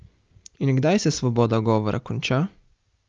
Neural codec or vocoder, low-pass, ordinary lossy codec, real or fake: none; 7.2 kHz; Opus, 24 kbps; real